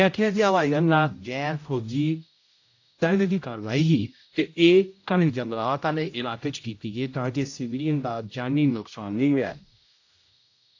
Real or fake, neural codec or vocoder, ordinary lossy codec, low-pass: fake; codec, 16 kHz, 0.5 kbps, X-Codec, HuBERT features, trained on general audio; AAC, 48 kbps; 7.2 kHz